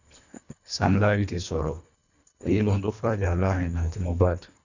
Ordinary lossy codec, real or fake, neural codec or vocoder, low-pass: none; fake; codec, 24 kHz, 1.5 kbps, HILCodec; 7.2 kHz